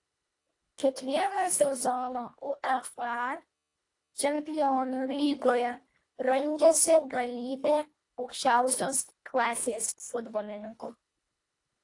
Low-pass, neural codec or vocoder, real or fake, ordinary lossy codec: 10.8 kHz; codec, 24 kHz, 1.5 kbps, HILCodec; fake; AAC, 48 kbps